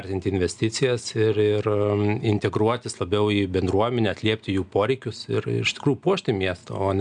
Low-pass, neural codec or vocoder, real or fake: 9.9 kHz; none; real